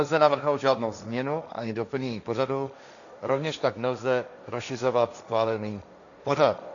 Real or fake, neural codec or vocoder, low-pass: fake; codec, 16 kHz, 1.1 kbps, Voila-Tokenizer; 7.2 kHz